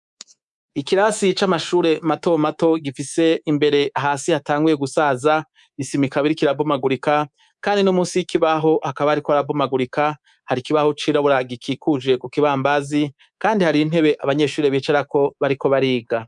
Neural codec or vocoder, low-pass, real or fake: codec, 24 kHz, 3.1 kbps, DualCodec; 10.8 kHz; fake